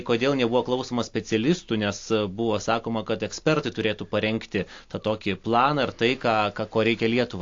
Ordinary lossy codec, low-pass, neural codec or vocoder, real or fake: AAC, 48 kbps; 7.2 kHz; none; real